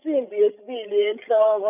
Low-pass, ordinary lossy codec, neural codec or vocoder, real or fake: 3.6 kHz; none; codec, 16 kHz, 16 kbps, FreqCodec, larger model; fake